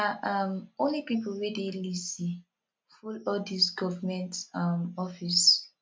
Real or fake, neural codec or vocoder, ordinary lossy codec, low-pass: real; none; none; none